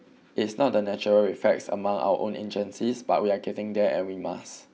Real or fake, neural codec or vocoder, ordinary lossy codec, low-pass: real; none; none; none